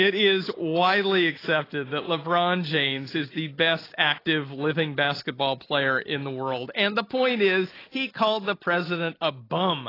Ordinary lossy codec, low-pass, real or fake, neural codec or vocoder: AAC, 24 kbps; 5.4 kHz; real; none